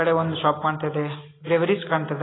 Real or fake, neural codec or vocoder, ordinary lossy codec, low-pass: real; none; AAC, 16 kbps; 7.2 kHz